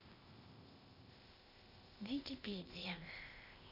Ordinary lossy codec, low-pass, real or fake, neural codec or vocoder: none; 5.4 kHz; fake; codec, 16 kHz, 0.8 kbps, ZipCodec